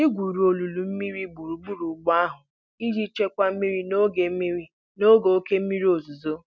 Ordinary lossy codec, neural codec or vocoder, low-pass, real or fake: none; none; none; real